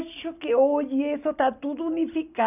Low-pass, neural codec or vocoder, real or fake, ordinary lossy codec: 3.6 kHz; none; real; none